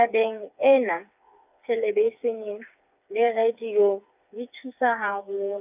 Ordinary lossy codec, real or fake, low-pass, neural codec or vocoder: none; fake; 3.6 kHz; codec, 16 kHz, 4 kbps, FreqCodec, smaller model